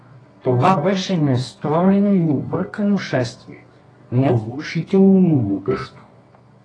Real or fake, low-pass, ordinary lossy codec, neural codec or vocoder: fake; 9.9 kHz; AAC, 32 kbps; codec, 24 kHz, 0.9 kbps, WavTokenizer, medium music audio release